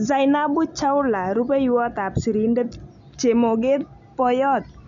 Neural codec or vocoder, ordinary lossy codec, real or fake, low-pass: none; none; real; 7.2 kHz